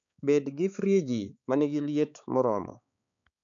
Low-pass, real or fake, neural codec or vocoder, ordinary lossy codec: 7.2 kHz; fake; codec, 16 kHz, 4 kbps, X-Codec, HuBERT features, trained on balanced general audio; none